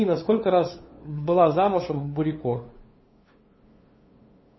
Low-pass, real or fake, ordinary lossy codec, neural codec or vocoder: 7.2 kHz; fake; MP3, 24 kbps; codec, 16 kHz, 2 kbps, FunCodec, trained on LibriTTS, 25 frames a second